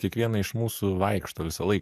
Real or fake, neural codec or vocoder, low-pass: fake; codec, 44.1 kHz, 7.8 kbps, DAC; 14.4 kHz